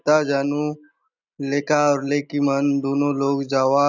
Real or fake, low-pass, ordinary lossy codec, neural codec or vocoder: real; 7.2 kHz; none; none